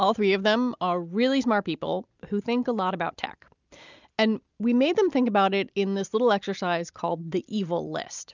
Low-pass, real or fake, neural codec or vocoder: 7.2 kHz; real; none